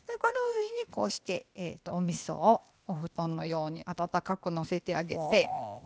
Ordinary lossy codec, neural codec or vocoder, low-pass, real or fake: none; codec, 16 kHz, 0.8 kbps, ZipCodec; none; fake